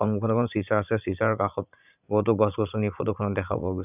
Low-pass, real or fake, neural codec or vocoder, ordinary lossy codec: 3.6 kHz; fake; vocoder, 44.1 kHz, 80 mel bands, Vocos; none